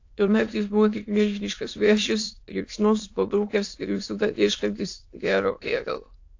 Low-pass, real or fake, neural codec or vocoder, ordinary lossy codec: 7.2 kHz; fake; autoencoder, 22.05 kHz, a latent of 192 numbers a frame, VITS, trained on many speakers; AAC, 48 kbps